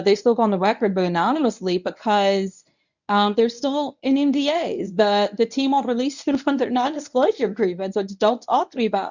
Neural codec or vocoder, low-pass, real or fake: codec, 24 kHz, 0.9 kbps, WavTokenizer, medium speech release version 1; 7.2 kHz; fake